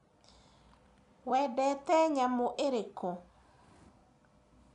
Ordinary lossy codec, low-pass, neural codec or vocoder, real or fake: none; 10.8 kHz; none; real